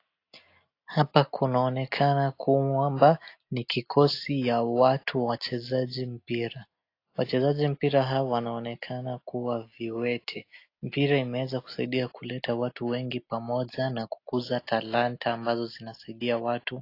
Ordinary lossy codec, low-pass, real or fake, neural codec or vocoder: AAC, 32 kbps; 5.4 kHz; real; none